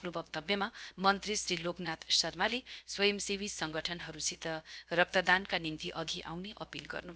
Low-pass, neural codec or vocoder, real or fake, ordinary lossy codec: none; codec, 16 kHz, 0.7 kbps, FocalCodec; fake; none